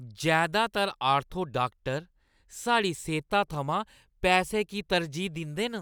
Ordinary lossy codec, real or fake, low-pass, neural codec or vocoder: none; real; none; none